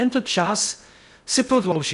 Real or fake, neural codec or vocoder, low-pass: fake; codec, 16 kHz in and 24 kHz out, 0.6 kbps, FocalCodec, streaming, 2048 codes; 10.8 kHz